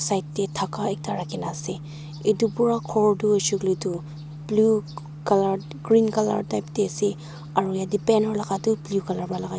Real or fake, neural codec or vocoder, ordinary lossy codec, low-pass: real; none; none; none